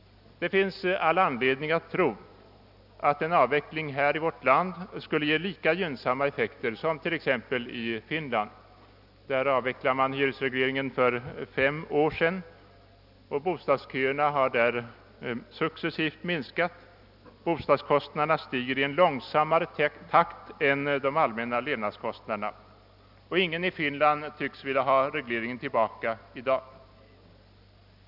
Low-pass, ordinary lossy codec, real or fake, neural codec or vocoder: 5.4 kHz; none; real; none